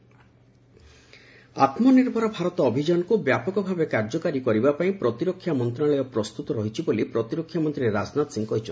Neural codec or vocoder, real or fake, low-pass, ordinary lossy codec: none; real; none; none